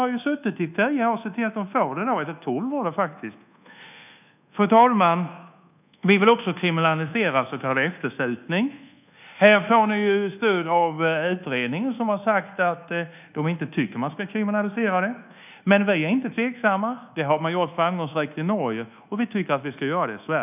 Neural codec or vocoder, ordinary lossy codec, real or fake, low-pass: codec, 24 kHz, 1.2 kbps, DualCodec; none; fake; 3.6 kHz